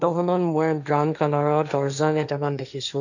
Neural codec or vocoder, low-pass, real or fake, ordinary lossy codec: codec, 16 kHz, 1.1 kbps, Voila-Tokenizer; 7.2 kHz; fake; none